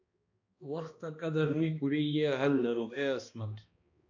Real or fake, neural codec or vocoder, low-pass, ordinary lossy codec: fake; codec, 16 kHz, 1 kbps, X-Codec, HuBERT features, trained on balanced general audio; 7.2 kHz; AAC, 48 kbps